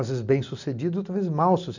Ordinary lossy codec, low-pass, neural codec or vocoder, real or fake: none; 7.2 kHz; none; real